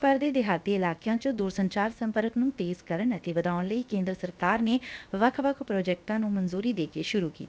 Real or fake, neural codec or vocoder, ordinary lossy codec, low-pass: fake; codec, 16 kHz, about 1 kbps, DyCAST, with the encoder's durations; none; none